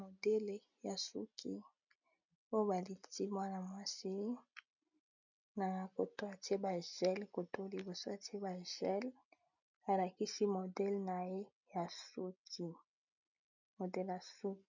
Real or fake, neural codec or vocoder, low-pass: real; none; 7.2 kHz